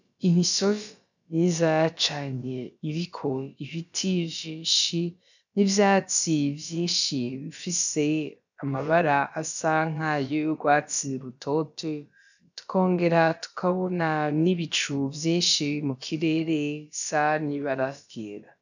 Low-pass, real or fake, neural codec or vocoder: 7.2 kHz; fake; codec, 16 kHz, about 1 kbps, DyCAST, with the encoder's durations